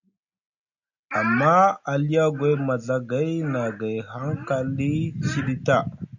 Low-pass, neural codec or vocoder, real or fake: 7.2 kHz; none; real